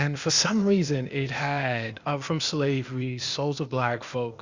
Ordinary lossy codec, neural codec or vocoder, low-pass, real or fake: Opus, 64 kbps; codec, 16 kHz, 0.8 kbps, ZipCodec; 7.2 kHz; fake